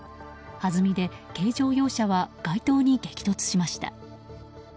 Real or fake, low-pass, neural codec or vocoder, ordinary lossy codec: real; none; none; none